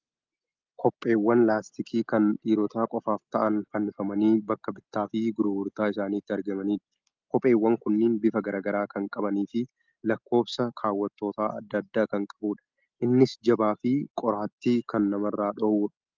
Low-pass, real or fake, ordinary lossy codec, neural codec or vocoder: 7.2 kHz; real; Opus, 32 kbps; none